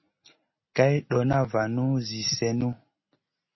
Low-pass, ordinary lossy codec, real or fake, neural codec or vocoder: 7.2 kHz; MP3, 24 kbps; real; none